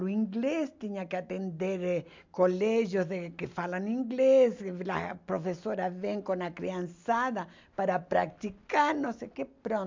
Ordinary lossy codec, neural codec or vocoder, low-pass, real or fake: MP3, 64 kbps; none; 7.2 kHz; real